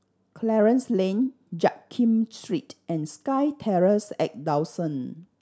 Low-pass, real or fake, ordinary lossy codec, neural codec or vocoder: none; real; none; none